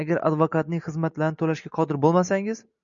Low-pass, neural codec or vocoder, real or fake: 7.2 kHz; none; real